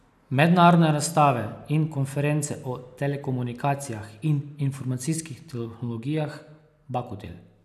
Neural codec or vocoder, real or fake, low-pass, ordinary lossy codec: none; real; 14.4 kHz; none